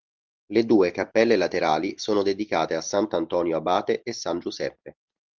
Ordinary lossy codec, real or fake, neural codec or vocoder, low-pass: Opus, 24 kbps; real; none; 7.2 kHz